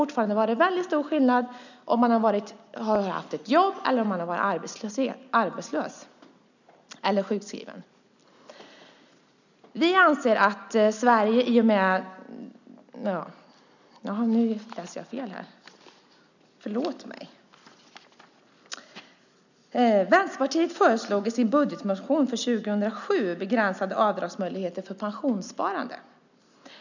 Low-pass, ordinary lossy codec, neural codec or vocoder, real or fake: 7.2 kHz; none; none; real